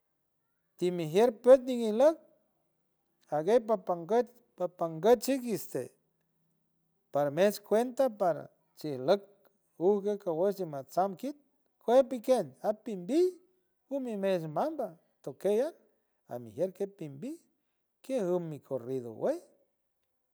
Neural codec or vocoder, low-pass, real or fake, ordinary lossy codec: none; none; real; none